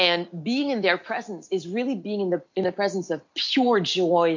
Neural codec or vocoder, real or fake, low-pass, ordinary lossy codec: none; real; 7.2 kHz; MP3, 48 kbps